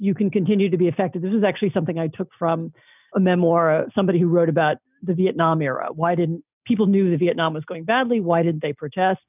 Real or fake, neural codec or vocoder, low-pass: real; none; 3.6 kHz